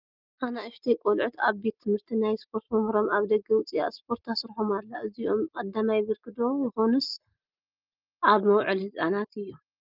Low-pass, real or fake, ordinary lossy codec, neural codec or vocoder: 5.4 kHz; real; Opus, 24 kbps; none